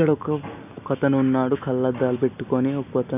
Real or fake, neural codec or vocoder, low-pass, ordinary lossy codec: real; none; 3.6 kHz; none